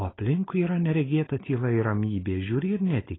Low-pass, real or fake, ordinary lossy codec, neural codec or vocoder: 7.2 kHz; real; AAC, 16 kbps; none